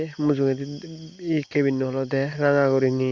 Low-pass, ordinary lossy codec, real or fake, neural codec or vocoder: 7.2 kHz; Opus, 64 kbps; real; none